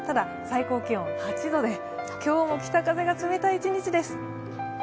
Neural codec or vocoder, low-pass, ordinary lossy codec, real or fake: none; none; none; real